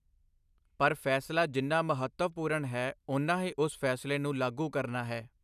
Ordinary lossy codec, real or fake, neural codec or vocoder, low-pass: none; real; none; 14.4 kHz